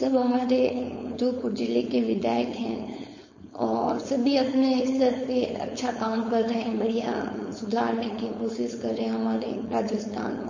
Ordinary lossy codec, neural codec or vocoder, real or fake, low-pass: MP3, 32 kbps; codec, 16 kHz, 4.8 kbps, FACodec; fake; 7.2 kHz